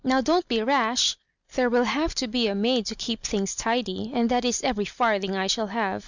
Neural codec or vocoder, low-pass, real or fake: none; 7.2 kHz; real